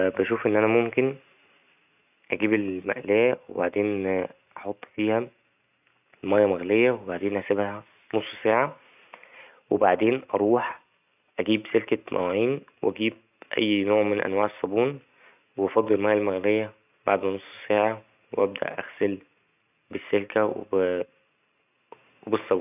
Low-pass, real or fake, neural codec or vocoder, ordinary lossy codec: 3.6 kHz; real; none; none